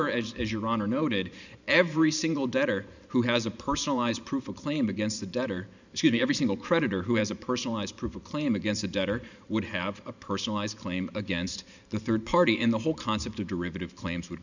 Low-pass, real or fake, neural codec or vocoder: 7.2 kHz; fake; vocoder, 44.1 kHz, 128 mel bands every 512 samples, BigVGAN v2